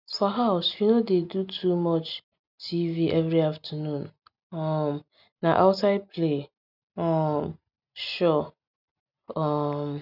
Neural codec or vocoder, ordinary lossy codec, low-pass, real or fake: none; none; 5.4 kHz; real